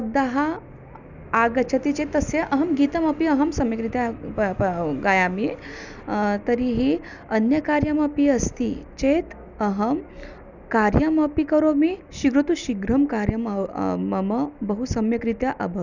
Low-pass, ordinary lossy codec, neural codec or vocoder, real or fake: 7.2 kHz; none; none; real